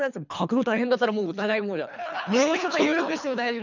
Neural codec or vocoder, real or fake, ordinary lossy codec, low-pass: codec, 24 kHz, 3 kbps, HILCodec; fake; none; 7.2 kHz